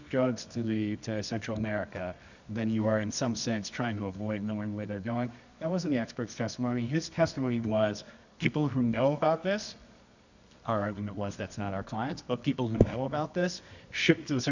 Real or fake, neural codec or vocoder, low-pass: fake; codec, 24 kHz, 0.9 kbps, WavTokenizer, medium music audio release; 7.2 kHz